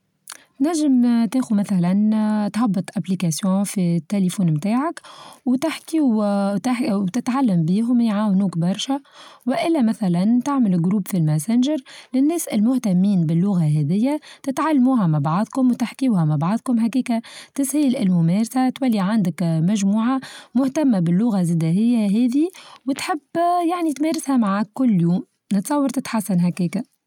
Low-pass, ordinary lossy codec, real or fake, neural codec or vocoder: 19.8 kHz; none; real; none